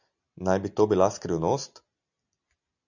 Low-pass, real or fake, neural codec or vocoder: 7.2 kHz; real; none